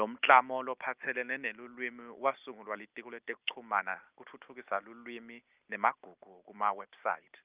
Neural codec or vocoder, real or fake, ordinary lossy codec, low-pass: none; real; Opus, 24 kbps; 3.6 kHz